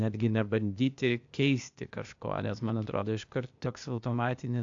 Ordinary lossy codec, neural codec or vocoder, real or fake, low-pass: AAC, 64 kbps; codec, 16 kHz, 0.8 kbps, ZipCodec; fake; 7.2 kHz